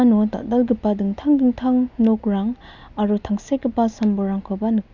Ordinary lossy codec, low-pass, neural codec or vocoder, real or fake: none; 7.2 kHz; none; real